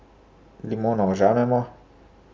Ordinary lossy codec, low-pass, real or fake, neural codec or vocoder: none; none; real; none